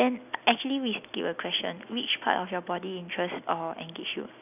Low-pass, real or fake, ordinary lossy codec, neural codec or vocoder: 3.6 kHz; real; none; none